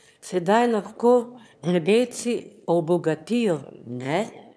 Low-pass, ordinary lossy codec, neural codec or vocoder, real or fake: none; none; autoencoder, 22.05 kHz, a latent of 192 numbers a frame, VITS, trained on one speaker; fake